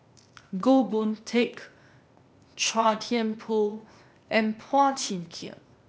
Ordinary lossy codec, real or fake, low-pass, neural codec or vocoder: none; fake; none; codec, 16 kHz, 0.8 kbps, ZipCodec